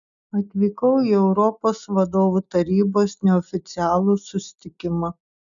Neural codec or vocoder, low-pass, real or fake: none; 7.2 kHz; real